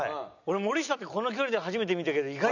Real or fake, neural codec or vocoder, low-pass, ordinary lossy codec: real; none; 7.2 kHz; none